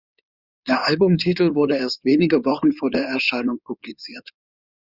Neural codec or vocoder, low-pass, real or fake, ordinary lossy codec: codec, 16 kHz in and 24 kHz out, 2.2 kbps, FireRedTTS-2 codec; 5.4 kHz; fake; Opus, 64 kbps